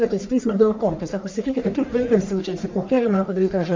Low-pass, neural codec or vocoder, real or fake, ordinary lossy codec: 7.2 kHz; codec, 44.1 kHz, 1.7 kbps, Pupu-Codec; fake; MP3, 64 kbps